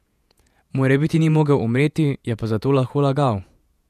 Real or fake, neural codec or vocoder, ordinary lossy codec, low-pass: fake; vocoder, 48 kHz, 128 mel bands, Vocos; none; 14.4 kHz